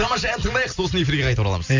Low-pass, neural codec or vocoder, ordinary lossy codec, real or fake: 7.2 kHz; none; none; real